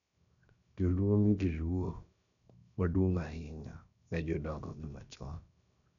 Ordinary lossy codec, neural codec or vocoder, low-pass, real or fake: none; codec, 16 kHz, 0.7 kbps, FocalCodec; 7.2 kHz; fake